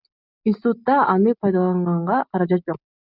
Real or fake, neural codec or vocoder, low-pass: real; none; 5.4 kHz